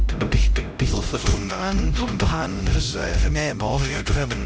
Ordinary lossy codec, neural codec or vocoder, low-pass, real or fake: none; codec, 16 kHz, 0.5 kbps, X-Codec, HuBERT features, trained on LibriSpeech; none; fake